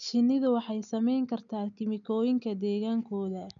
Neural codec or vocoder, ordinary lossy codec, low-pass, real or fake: none; none; 7.2 kHz; real